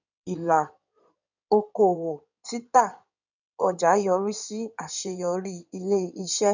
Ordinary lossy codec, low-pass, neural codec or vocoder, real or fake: none; 7.2 kHz; codec, 16 kHz in and 24 kHz out, 2.2 kbps, FireRedTTS-2 codec; fake